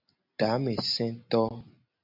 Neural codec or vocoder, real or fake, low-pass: none; real; 5.4 kHz